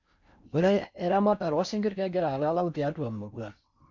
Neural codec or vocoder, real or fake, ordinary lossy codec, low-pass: codec, 16 kHz in and 24 kHz out, 0.6 kbps, FocalCodec, streaming, 4096 codes; fake; none; 7.2 kHz